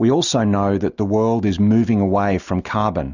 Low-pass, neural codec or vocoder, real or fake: 7.2 kHz; none; real